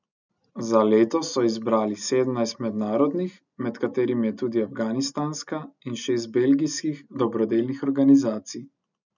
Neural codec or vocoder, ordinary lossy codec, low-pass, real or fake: none; none; 7.2 kHz; real